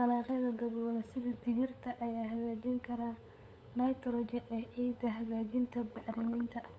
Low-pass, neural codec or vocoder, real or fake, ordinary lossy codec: none; codec, 16 kHz, 8 kbps, FunCodec, trained on LibriTTS, 25 frames a second; fake; none